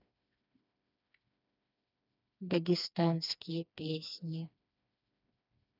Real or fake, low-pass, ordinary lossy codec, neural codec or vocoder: fake; 5.4 kHz; none; codec, 16 kHz, 2 kbps, FreqCodec, smaller model